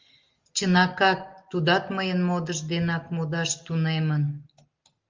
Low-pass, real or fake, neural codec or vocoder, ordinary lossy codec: 7.2 kHz; real; none; Opus, 32 kbps